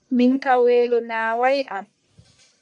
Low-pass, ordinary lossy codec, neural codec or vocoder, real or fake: 10.8 kHz; MP3, 64 kbps; codec, 44.1 kHz, 1.7 kbps, Pupu-Codec; fake